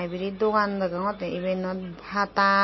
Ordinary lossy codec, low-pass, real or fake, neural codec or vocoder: MP3, 24 kbps; 7.2 kHz; real; none